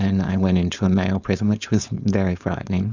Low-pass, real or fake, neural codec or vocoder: 7.2 kHz; fake; codec, 16 kHz, 4.8 kbps, FACodec